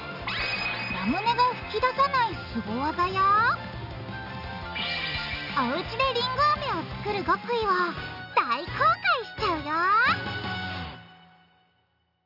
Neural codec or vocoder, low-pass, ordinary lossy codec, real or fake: none; 5.4 kHz; none; real